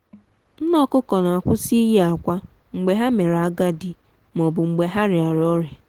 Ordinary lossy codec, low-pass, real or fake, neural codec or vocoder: Opus, 16 kbps; 19.8 kHz; real; none